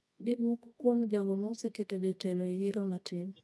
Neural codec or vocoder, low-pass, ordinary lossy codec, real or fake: codec, 24 kHz, 0.9 kbps, WavTokenizer, medium music audio release; none; none; fake